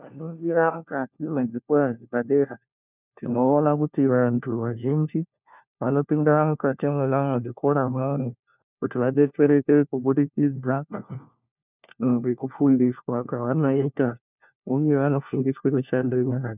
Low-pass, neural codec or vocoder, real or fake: 3.6 kHz; codec, 16 kHz, 1 kbps, FunCodec, trained on LibriTTS, 50 frames a second; fake